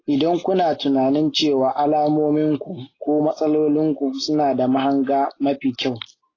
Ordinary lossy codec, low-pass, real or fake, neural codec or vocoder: AAC, 32 kbps; 7.2 kHz; real; none